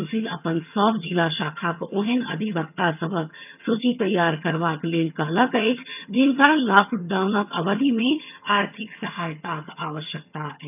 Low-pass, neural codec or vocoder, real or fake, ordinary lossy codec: 3.6 kHz; vocoder, 22.05 kHz, 80 mel bands, HiFi-GAN; fake; none